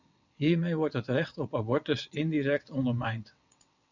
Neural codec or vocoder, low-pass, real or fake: vocoder, 22.05 kHz, 80 mel bands, WaveNeXt; 7.2 kHz; fake